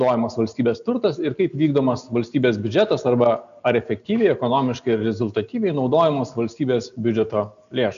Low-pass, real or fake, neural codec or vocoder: 7.2 kHz; real; none